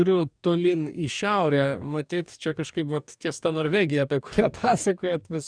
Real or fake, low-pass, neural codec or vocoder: fake; 9.9 kHz; codec, 44.1 kHz, 2.6 kbps, DAC